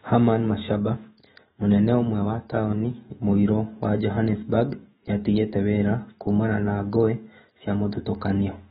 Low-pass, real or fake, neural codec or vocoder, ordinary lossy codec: 19.8 kHz; fake; autoencoder, 48 kHz, 128 numbers a frame, DAC-VAE, trained on Japanese speech; AAC, 16 kbps